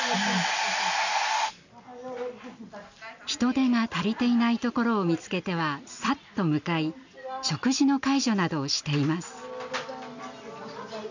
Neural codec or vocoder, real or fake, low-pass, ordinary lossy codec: none; real; 7.2 kHz; none